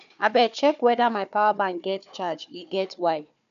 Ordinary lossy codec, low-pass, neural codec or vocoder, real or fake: none; 7.2 kHz; codec, 16 kHz, 4 kbps, FunCodec, trained on Chinese and English, 50 frames a second; fake